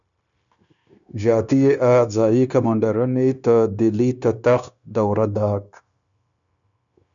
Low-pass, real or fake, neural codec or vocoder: 7.2 kHz; fake; codec, 16 kHz, 0.9 kbps, LongCat-Audio-Codec